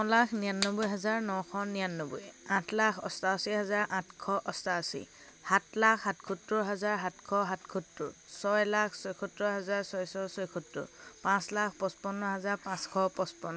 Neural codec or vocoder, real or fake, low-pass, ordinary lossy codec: none; real; none; none